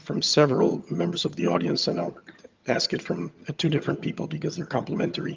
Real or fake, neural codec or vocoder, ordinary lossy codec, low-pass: fake; vocoder, 22.05 kHz, 80 mel bands, HiFi-GAN; Opus, 24 kbps; 7.2 kHz